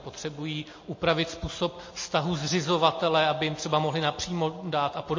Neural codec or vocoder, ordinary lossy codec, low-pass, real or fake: none; MP3, 32 kbps; 7.2 kHz; real